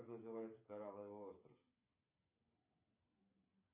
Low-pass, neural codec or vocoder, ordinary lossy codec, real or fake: 3.6 kHz; codec, 16 kHz, 8 kbps, FreqCodec, smaller model; AAC, 32 kbps; fake